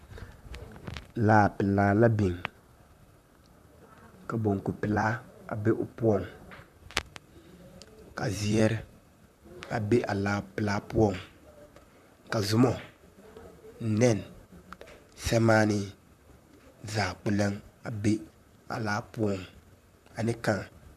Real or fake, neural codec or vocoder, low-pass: fake; vocoder, 44.1 kHz, 128 mel bands, Pupu-Vocoder; 14.4 kHz